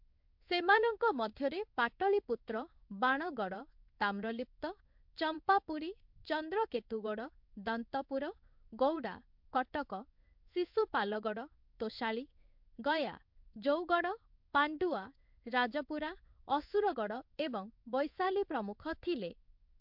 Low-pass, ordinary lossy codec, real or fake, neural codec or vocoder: 5.4 kHz; MP3, 48 kbps; fake; codec, 16 kHz in and 24 kHz out, 1 kbps, XY-Tokenizer